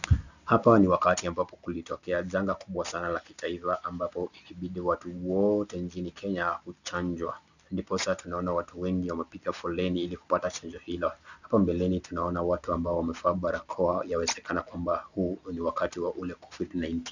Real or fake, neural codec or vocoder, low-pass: real; none; 7.2 kHz